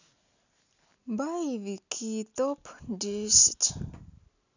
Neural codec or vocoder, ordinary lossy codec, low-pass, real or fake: none; none; 7.2 kHz; real